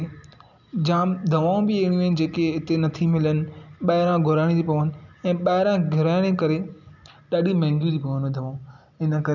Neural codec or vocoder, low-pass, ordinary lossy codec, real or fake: none; 7.2 kHz; none; real